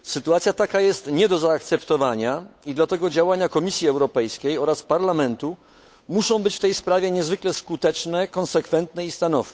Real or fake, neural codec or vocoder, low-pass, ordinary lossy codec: fake; codec, 16 kHz, 8 kbps, FunCodec, trained on Chinese and English, 25 frames a second; none; none